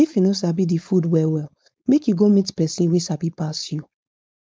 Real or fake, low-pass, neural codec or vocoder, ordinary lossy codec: fake; none; codec, 16 kHz, 4.8 kbps, FACodec; none